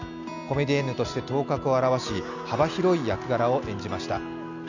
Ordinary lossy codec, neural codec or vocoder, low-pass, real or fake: none; none; 7.2 kHz; real